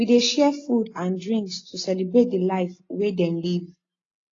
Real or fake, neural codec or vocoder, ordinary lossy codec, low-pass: real; none; AAC, 32 kbps; 7.2 kHz